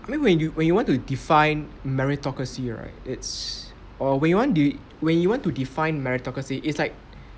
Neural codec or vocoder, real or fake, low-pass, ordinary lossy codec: none; real; none; none